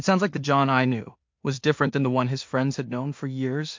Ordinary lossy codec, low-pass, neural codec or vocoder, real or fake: MP3, 48 kbps; 7.2 kHz; codec, 16 kHz in and 24 kHz out, 0.4 kbps, LongCat-Audio-Codec, two codebook decoder; fake